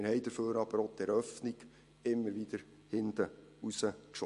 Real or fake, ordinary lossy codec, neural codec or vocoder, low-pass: real; MP3, 48 kbps; none; 14.4 kHz